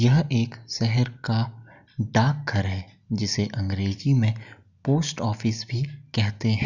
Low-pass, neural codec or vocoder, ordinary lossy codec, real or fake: 7.2 kHz; none; none; real